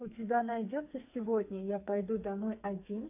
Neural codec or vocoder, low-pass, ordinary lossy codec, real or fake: codec, 44.1 kHz, 2.6 kbps, SNAC; 3.6 kHz; AAC, 24 kbps; fake